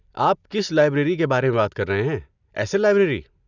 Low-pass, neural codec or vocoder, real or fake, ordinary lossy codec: 7.2 kHz; none; real; none